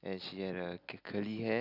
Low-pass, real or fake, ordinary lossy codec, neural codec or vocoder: 5.4 kHz; real; none; none